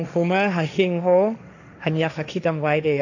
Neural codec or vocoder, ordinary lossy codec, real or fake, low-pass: codec, 16 kHz, 1.1 kbps, Voila-Tokenizer; none; fake; 7.2 kHz